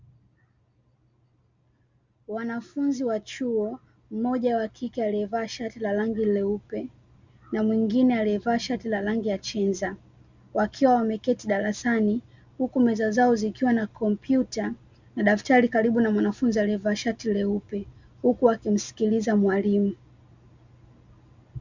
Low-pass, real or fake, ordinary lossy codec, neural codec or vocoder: 7.2 kHz; real; Opus, 64 kbps; none